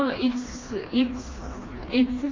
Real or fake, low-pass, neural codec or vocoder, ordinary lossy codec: fake; 7.2 kHz; codec, 16 kHz, 2 kbps, FreqCodec, smaller model; AAC, 32 kbps